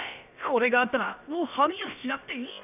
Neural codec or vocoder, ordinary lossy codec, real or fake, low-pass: codec, 16 kHz, about 1 kbps, DyCAST, with the encoder's durations; none; fake; 3.6 kHz